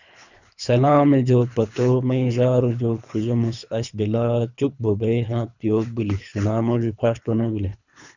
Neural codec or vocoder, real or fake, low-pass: codec, 24 kHz, 3 kbps, HILCodec; fake; 7.2 kHz